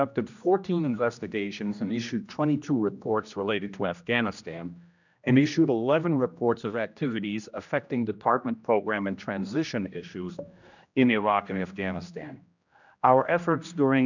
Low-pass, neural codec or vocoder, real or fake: 7.2 kHz; codec, 16 kHz, 1 kbps, X-Codec, HuBERT features, trained on general audio; fake